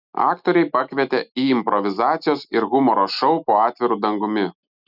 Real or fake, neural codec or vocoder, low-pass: real; none; 5.4 kHz